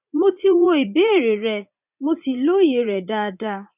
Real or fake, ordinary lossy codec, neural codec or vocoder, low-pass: fake; none; vocoder, 44.1 kHz, 80 mel bands, Vocos; 3.6 kHz